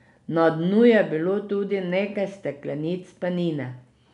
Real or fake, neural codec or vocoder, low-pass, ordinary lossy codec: real; none; 10.8 kHz; none